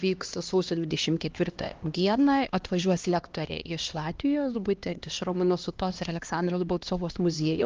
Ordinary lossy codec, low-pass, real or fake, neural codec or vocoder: Opus, 24 kbps; 7.2 kHz; fake; codec, 16 kHz, 1 kbps, X-Codec, HuBERT features, trained on LibriSpeech